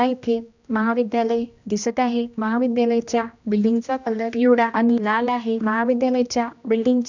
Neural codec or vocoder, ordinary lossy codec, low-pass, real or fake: codec, 16 kHz, 1 kbps, X-Codec, HuBERT features, trained on general audio; none; 7.2 kHz; fake